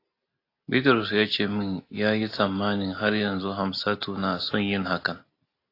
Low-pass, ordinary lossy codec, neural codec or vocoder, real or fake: 5.4 kHz; AAC, 32 kbps; none; real